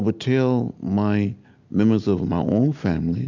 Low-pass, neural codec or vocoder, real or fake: 7.2 kHz; none; real